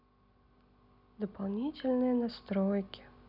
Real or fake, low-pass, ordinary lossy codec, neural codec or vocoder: real; 5.4 kHz; none; none